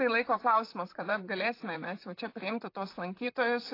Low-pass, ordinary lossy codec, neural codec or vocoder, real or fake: 5.4 kHz; AAC, 32 kbps; vocoder, 44.1 kHz, 128 mel bands, Pupu-Vocoder; fake